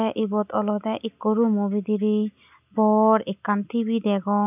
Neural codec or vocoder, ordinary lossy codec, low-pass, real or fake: none; none; 3.6 kHz; real